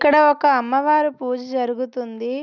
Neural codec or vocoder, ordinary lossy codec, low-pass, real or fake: none; none; 7.2 kHz; real